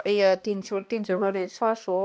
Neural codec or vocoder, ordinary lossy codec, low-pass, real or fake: codec, 16 kHz, 1 kbps, X-Codec, HuBERT features, trained on balanced general audio; none; none; fake